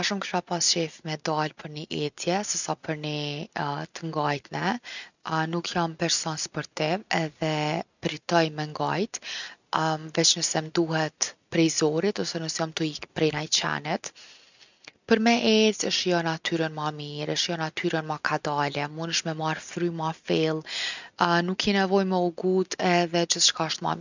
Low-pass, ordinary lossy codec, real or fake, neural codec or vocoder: 7.2 kHz; none; real; none